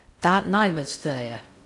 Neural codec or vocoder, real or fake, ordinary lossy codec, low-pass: codec, 16 kHz in and 24 kHz out, 0.6 kbps, FocalCodec, streaming, 4096 codes; fake; none; 10.8 kHz